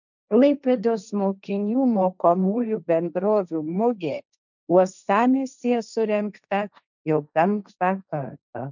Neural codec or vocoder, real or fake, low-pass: codec, 16 kHz, 1.1 kbps, Voila-Tokenizer; fake; 7.2 kHz